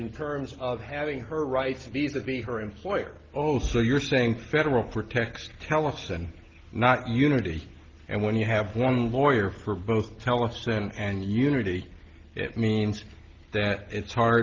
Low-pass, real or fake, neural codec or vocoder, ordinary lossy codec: 7.2 kHz; real; none; Opus, 16 kbps